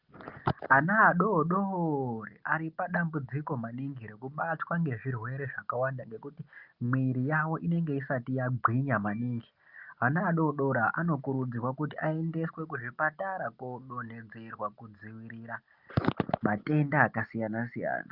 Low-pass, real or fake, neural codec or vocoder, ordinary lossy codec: 5.4 kHz; real; none; Opus, 24 kbps